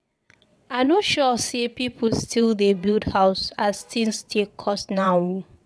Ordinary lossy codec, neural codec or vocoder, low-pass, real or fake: none; vocoder, 22.05 kHz, 80 mel bands, WaveNeXt; none; fake